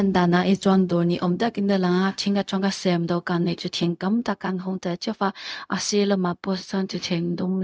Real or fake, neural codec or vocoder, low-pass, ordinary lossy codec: fake; codec, 16 kHz, 0.4 kbps, LongCat-Audio-Codec; none; none